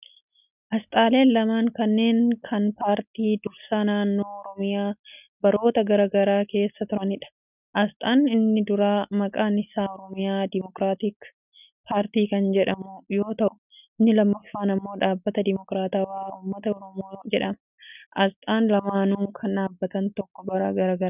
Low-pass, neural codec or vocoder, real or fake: 3.6 kHz; none; real